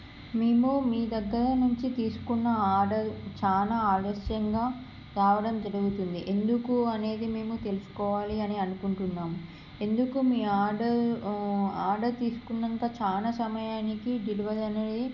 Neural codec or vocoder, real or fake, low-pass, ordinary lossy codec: none; real; 7.2 kHz; none